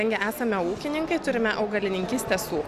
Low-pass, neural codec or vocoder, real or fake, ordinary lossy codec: 14.4 kHz; none; real; Opus, 64 kbps